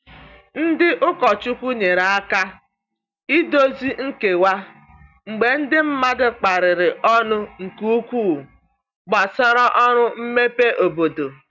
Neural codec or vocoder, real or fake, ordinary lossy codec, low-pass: none; real; none; 7.2 kHz